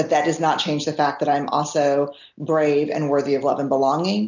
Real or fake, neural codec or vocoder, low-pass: real; none; 7.2 kHz